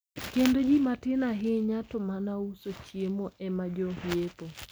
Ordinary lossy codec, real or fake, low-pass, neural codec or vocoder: none; real; none; none